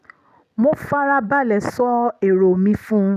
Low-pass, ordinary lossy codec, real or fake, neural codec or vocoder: 14.4 kHz; none; real; none